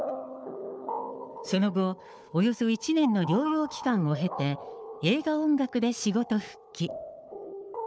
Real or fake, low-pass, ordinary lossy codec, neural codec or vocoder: fake; none; none; codec, 16 kHz, 4 kbps, FunCodec, trained on Chinese and English, 50 frames a second